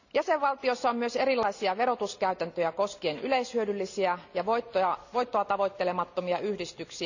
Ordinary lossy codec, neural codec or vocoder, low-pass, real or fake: none; none; 7.2 kHz; real